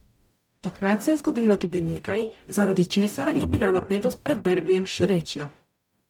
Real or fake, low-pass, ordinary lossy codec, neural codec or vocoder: fake; 19.8 kHz; MP3, 96 kbps; codec, 44.1 kHz, 0.9 kbps, DAC